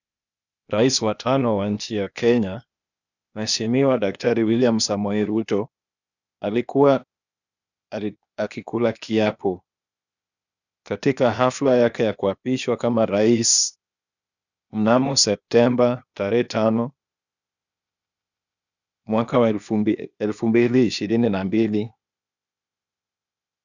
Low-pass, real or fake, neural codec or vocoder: 7.2 kHz; fake; codec, 16 kHz, 0.8 kbps, ZipCodec